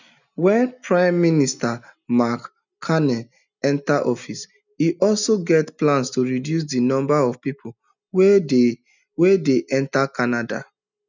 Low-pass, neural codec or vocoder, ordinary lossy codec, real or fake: 7.2 kHz; none; none; real